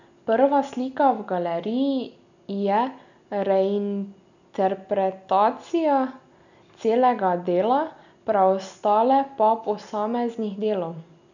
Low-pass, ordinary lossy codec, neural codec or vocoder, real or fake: 7.2 kHz; none; none; real